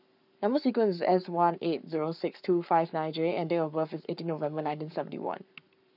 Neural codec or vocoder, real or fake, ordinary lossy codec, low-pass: codec, 44.1 kHz, 7.8 kbps, Pupu-Codec; fake; none; 5.4 kHz